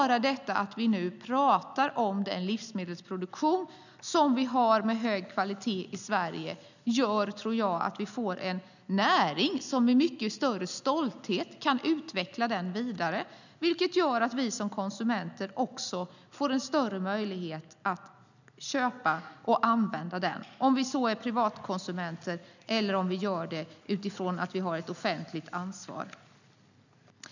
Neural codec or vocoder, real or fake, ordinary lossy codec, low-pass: none; real; none; 7.2 kHz